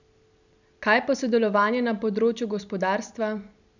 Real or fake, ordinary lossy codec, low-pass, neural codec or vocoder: real; none; 7.2 kHz; none